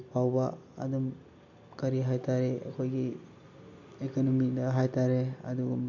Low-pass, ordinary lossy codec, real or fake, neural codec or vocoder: 7.2 kHz; MP3, 48 kbps; real; none